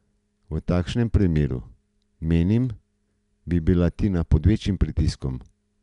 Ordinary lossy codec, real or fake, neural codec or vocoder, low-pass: none; real; none; 10.8 kHz